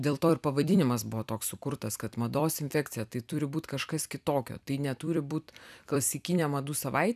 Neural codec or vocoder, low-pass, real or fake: vocoder, 44.1 kHz, 128 mel bands every 256 samples, BigVGAN v2; 14.4 kHz; fake